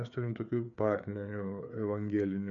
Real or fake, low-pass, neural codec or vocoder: fake; 7.2 kHz; codec, 16 kHz, 4 kbps, FreqCodec, larger model